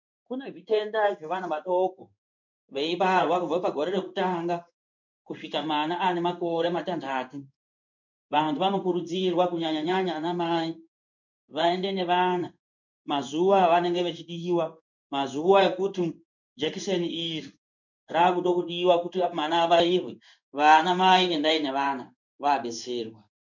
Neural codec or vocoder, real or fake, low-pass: codec, 16 kHz in and 24 kHz out, 1 kbps, XY-Tokenizer; fake; 7.2 kHz